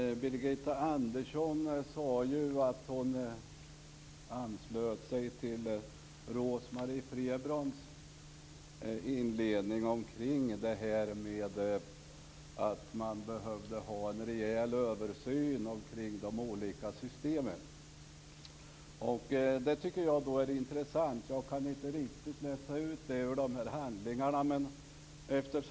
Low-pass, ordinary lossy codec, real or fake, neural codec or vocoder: none; none; real; none